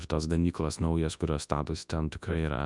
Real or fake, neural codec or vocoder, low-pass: fake; codec, 24 kHz, 0.9 kbps, WavTokenizer, large speech release; 10.8 kHz